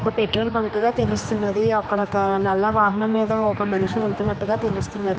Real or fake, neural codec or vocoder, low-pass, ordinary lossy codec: fake; codec, 16 kHz, 2 kbps, X-Codec, HuBERT features, trained on general audio; none; none